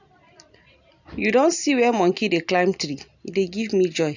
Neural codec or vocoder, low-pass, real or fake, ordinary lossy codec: none; 7.2 kHz; real; none